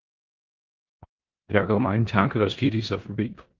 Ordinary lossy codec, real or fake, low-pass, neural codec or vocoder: Opus, 24 kbps; fake; 7.2 kHz; codec, 16 kHz in and 24 kHz out, 0.9 kbps, LongCat-Audio-Codec, four codebook decoder